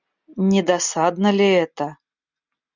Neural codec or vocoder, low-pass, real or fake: none; 7.2 kHz; real